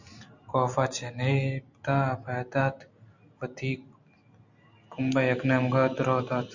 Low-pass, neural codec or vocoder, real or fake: 7.2 kHz; none; real